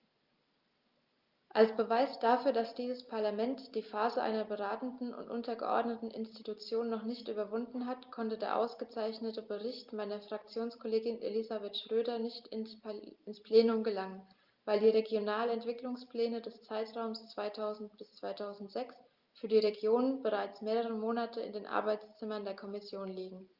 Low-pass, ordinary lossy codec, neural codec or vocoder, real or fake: 5.4 kHz; Opus, 24 kbps; none; real